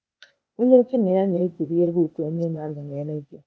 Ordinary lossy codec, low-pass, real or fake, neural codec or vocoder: none; none; fake; codec, 16 kHz, 0.8 kbps, ZipCodec